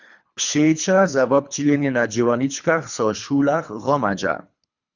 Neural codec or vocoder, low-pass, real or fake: codec, 24 kHz, 3 kbps, HILCodec; 7.2 kHz; fake